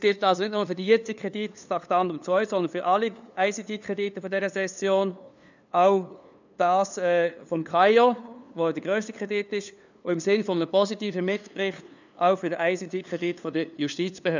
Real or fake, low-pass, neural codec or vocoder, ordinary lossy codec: fake; 7.2 kHz; codec, 16 kHz, 2 kbps, FunCodec, trained on LibriTTS, 25 frames a second; none